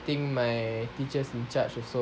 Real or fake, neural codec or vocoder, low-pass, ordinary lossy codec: real; none; none; none